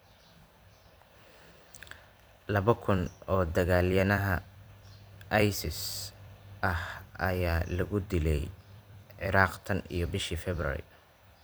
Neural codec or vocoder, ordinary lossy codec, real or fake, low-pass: vocoder, 44.1 kHz, 128 mel bands every 256 samples, BigVGAN v2; none; fake; none